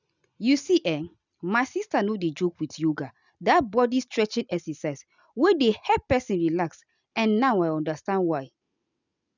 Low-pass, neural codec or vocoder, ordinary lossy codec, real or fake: 7.2 kHz; none; none; real